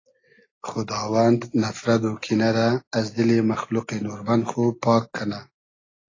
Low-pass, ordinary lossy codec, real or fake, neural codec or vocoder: 7.2 kHz; AAC, 32 kbps; real; none